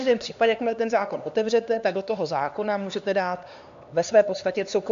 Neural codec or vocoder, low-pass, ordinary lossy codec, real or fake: codec, 16 kHz, 2 kbps, X-Codec, HuBERT features, trained on LibriSpeech; 7.2 kHz; AAC, 64 kbps; fake